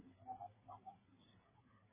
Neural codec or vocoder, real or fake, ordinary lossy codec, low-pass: codec, 16 kHz, 8 kbps, FreqCodec, smaller model; fake; MP3, 16 kbps; 3.6 kHz